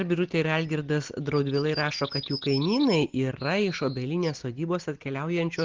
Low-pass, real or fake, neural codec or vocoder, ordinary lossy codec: 7.2 kHz; real; none; Opus, 16 kbps